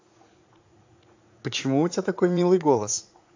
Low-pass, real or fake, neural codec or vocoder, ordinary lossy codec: 7.2 kHz; fake; vocoder, 44.1 kHz, 80 mel bands, Vocos; AAC, 48 kbps